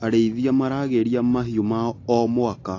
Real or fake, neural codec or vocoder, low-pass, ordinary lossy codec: real; none; 7.2 kHz; AAC, 32 kbps